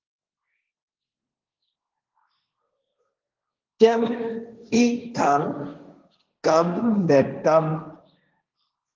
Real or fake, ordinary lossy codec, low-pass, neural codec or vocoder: fake; Opus, 32 kbps; 7.2 kHz; codec, 16 kHz, 1.1 kbps, Voila-Tokenizer